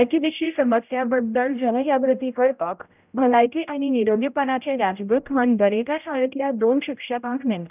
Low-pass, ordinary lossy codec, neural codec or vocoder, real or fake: 3.6 kHz; none; codec, 16 kHz, 0.5 kbps, X-Codec, HuBERT features, trained on general audio; fake